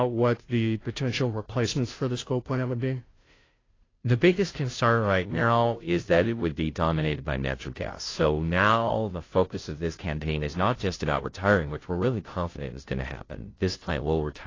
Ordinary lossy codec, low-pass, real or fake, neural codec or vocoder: AAC, 32 kbps; 7.2 kHz; fake; codec, 16 kHz, 0.5 kbps, FunCodec, trained on Chinese and English, 25 frames a second